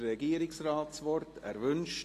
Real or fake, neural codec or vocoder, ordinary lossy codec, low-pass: real; none; AAC, 48 kbps; 14.4 kHz